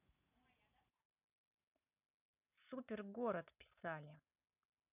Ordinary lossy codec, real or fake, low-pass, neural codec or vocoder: none; real; 3.6 kHz; none